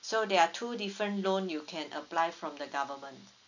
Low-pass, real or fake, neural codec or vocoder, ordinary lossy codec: 7.2 kHz; real; none; none